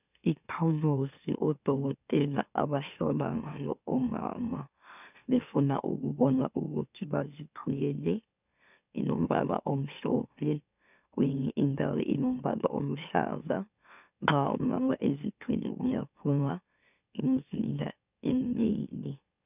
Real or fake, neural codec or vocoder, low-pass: fake; autoencoder, 44.1 kHz, a latent of 192 numbers a frame, MeloTTS; 3.6 kHz